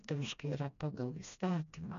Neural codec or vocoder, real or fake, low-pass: codec, 16 kHz, 1 kbps, FreqCodec, smaller model; fake; 7.2 kHz